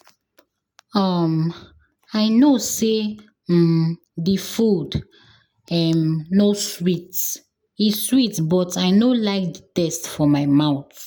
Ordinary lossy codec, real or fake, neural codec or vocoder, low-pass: none; real; none; none